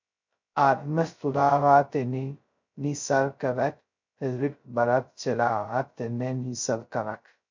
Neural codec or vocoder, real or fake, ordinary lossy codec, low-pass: codec, 16 kHz, 0.2 kbps, FocalCodec; fake; MP3, 64 kbps; 7.2 kHz